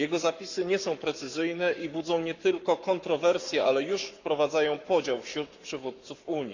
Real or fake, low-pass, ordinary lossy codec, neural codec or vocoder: fake; 7.2 kHz; none; codec, 44.1 kHz, 7.8 kbps, Pupu-Codec